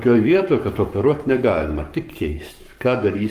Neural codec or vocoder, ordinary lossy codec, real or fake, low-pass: codec, 44.1 kHz, 7.8 kbps, Pupu-Codec; Opus, 32 kbps; fake; 14.4 kHz